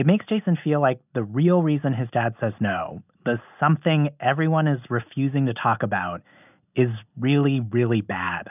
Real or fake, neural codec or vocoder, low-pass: real; none; 3.6 kHz